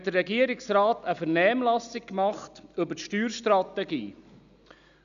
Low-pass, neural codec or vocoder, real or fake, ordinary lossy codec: 7.2 kHz; none; real; none